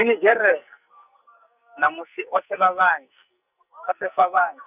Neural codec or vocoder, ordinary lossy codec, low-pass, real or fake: codec, 44.1 kHz, 2.6 kbps, SNAC; none; 3.6 kHz; fake